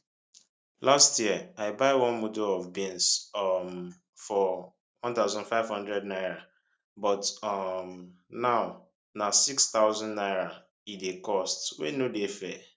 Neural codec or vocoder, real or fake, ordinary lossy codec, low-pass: none; real; none; none